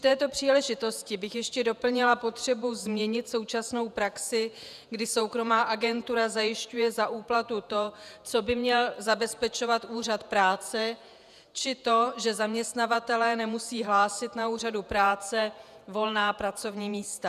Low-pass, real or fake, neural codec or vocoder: 14.4 kHz; fake; vocoder, 48 kHz, 128 mel bands, Vocos